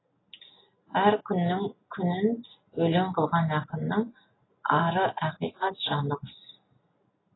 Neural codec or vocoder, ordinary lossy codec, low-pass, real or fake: none; AAC, 16 kbps; 7.2 kHz; real